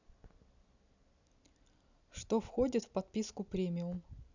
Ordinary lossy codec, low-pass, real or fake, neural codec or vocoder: none; 7.2 kHz; real; none